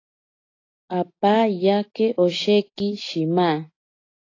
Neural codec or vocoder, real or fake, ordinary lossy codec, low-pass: none; real; AAC, 32 kbps; 7.2 kHz